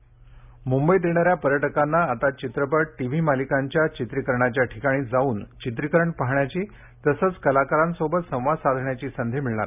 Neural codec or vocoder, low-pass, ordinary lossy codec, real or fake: none; 3.6 kHz; none; real